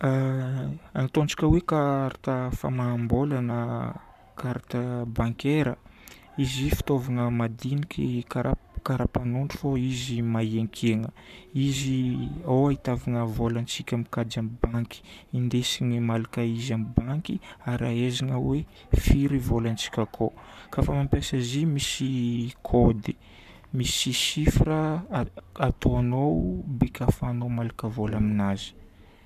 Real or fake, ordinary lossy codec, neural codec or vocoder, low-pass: fake; none; codec, 44.1 kHz, 7.8 kbps, Pupu-Codec; 14.4 kHz